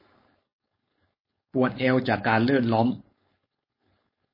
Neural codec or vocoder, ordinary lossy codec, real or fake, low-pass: codec, 16 kHz, 4.8 kbps, FACodec; MP3, 24 kbps; fake; 5.4 kHz